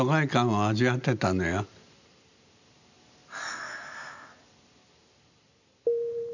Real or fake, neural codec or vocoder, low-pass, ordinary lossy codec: real; none; 7.2 kHz; none